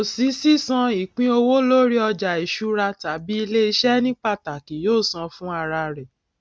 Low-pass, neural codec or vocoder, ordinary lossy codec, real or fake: none; none; none; real